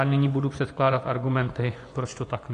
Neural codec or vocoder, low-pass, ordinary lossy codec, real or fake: vocoder, 24 kHz, 100 mel bands, Vocos; 10.8 kHz; AAC, 48 kbps; fake